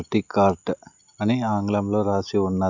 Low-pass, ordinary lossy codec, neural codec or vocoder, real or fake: 7.2 kHz; none; none; real